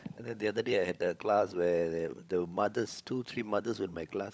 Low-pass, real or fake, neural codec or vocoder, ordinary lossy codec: none; fake; codec, 16 kHz, 16 kbps, FunCodec, trained on LibriTTS, 50 frames a second; none